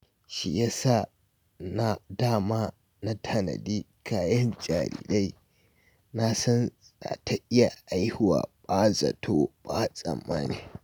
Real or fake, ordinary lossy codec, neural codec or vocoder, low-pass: real; none; none; none